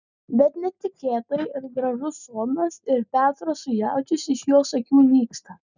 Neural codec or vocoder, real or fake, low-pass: none; real; 7.2 kHz